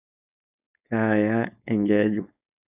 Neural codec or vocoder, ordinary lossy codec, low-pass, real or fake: codec, 16 kHz, 4.8 kbps, FACodec; none; 3.6 kHz; fake